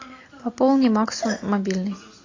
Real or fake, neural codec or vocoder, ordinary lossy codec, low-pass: real; none; AAC, 32 kbps; 7.2 kHz